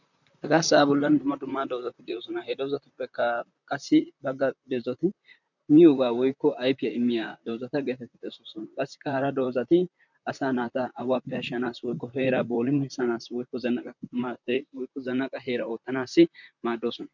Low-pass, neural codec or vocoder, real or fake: 7.2 kHz; vocoder, 44.1 kHz, 128 mel bands, Pupu-Vocoder; fake